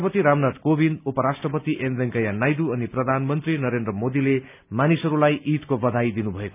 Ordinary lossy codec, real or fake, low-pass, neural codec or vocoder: none; real; 3.6 kHz; none